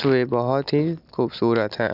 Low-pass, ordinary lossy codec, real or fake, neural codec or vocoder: 5.4 kHz; none; real; none